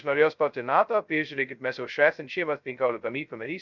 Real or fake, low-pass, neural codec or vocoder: fake; 7.2 kHz; codec, 16 kHz, 0.2 kbps, FocalCodec